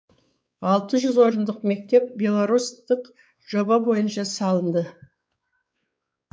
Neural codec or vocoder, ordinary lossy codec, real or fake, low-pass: codec, 16 kHz, 4 kbps, X-Codec, WavLM features, trained on Multilingual LibriSpeech; none; fake; none